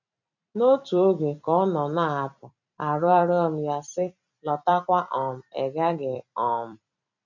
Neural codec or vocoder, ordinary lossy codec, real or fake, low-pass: vocoder, 44.1 kHz, 128 mel bands every 256 samples, BigVGAN v2; none; fake; 7.2 kHz